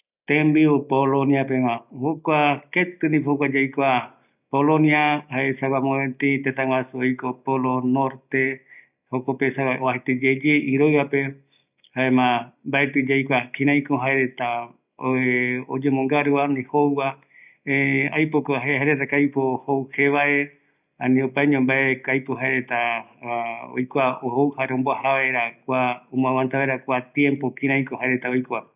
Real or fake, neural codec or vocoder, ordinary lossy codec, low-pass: real; none; none; 3.6 kHz